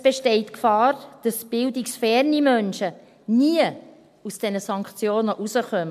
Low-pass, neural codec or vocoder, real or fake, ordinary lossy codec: 14.4 kHz; none; real; AAC, 96 kbps